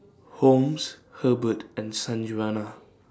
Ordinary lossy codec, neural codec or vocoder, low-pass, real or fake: none; none; none; real